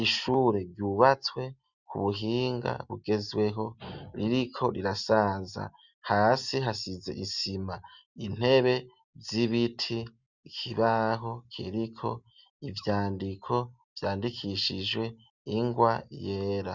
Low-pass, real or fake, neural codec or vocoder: 7.2 kHz; real; none